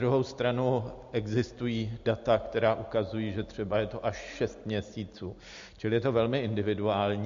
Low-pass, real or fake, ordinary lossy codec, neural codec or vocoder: 7.2 kHz; real; MP3, 48 kbps; none